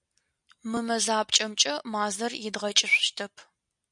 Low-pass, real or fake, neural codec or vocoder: 10.8 kHz; real; none